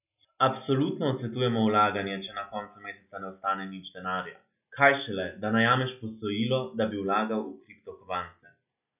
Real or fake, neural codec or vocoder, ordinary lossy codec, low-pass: real; none; none; 3.6 kHz